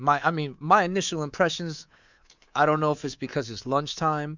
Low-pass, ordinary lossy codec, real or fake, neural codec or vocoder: 7.2 kHz; Opus, 64 kbps; fake; codec, 24 kHz, 3.1 kbps, DualCodec